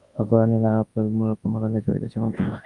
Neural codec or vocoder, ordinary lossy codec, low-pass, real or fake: codec, 24 kHz, 0.9 kbps, WavTokenizer, large speech release; Opus, 32 kbps; 10.8 kHz; fake